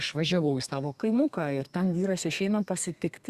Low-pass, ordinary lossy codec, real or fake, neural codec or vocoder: 14.4 kHz; Opus, 64 kbps; fake; codec, 32 kHz, 1.9 kbps, SNAC